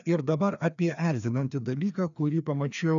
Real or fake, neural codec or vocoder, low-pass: fake; codec, 16 kHz, 2 kbps, FreqCodec, larger model; 7.2 kHz